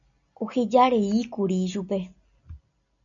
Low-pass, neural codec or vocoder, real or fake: 7.2 kHz; none; real